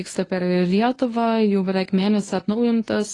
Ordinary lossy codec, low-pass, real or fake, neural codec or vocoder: AAC, 32 kbps; 10.8 kHz; fake; codec, 24 kHz, 0.9 kbps, WavTokenizer, medium speech release version 1